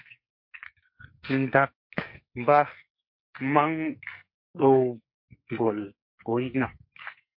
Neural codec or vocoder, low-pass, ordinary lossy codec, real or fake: codec, 44.1 kHz, 2.6 kbps, SNAC; 5.4 kHz; MP3, 32 kbps; fake